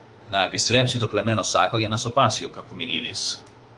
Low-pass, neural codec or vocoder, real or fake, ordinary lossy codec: 10.8 kHz; autoencoder, 48 kHz, 32 numbers a frame, DAC-VAE, trained on Japanese speech; fake; Opus, 24 kbps